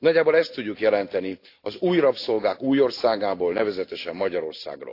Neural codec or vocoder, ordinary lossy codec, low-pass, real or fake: none; AAC, 32 kbps; 5.4 kHz; real